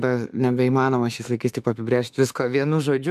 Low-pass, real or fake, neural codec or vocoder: 14.4 kHz; fake; autoencoder, 48 kHz, 32 numbers a frame, DAC-VAE, trained on Japanese speech